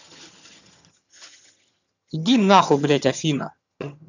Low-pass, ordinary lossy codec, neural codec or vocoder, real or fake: 7.2 kHz; none; vocoder, 22.05 kHz, 80 mel bands, HiFi-GAN; fake